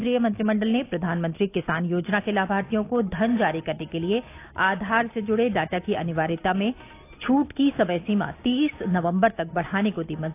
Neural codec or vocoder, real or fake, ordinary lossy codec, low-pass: none; real; AAC, 24 kbps; 3.6 kHz